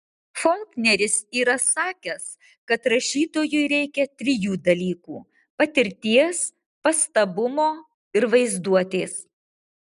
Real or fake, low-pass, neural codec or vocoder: real; 10.8 kHz; none